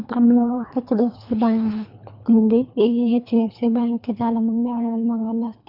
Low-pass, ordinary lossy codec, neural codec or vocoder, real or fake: 5.4 kHz; none; codec, 24 kHz, 3 kbps, HILCodec; fake